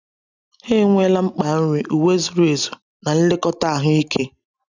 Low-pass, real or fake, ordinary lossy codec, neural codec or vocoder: 7.2 kHz; real; none; none